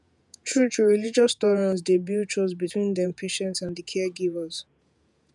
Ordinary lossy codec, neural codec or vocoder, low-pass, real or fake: none; vocoder, 48 kHz, 128 mel bands, Vocos; 10.8 kHz; fake